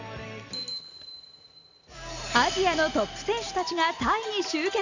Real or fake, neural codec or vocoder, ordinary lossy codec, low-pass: real; none; none; 7.2 kHz